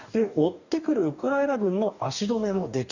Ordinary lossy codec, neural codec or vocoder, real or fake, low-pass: none; codec, 44.1 kHz, 2.6 kbps, DAC; fake; 7.2 kHz